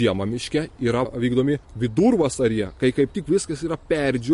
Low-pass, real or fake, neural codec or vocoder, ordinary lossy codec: 10.8 kHz; real; none; MP3, 48 kbps